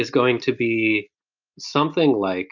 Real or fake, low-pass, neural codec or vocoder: real; 7.2 kHz; none